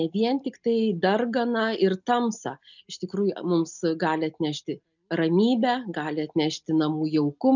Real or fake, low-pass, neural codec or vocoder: real; 7.2 kHz; none